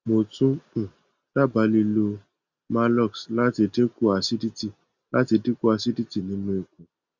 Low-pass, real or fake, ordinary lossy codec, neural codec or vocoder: 7.2 kHz; real; Opus, 64 kbps; none